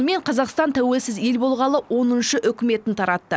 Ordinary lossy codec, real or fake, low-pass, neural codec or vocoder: none; real; none; none